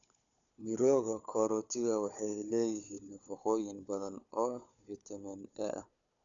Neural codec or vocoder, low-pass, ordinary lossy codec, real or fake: codec, 16 kHz, 4 kbps, FunCodec, trained on Chinese and English, 50 frames a second; 7.2 kHz; none; fake